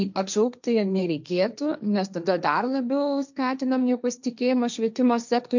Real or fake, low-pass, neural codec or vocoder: fake; 7.2 kHz; codec, 16 kHz, 1.1 kbps, Voila-Tokenizer